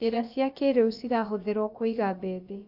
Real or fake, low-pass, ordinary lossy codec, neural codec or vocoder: fake; 5.4 kHz; AAC, 48 kbps; codec, 16 kHz, about 1 kbps, DyCAST, with the encoder's durations